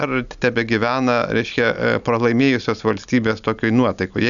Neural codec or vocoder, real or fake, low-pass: none; real; 7.2 kHz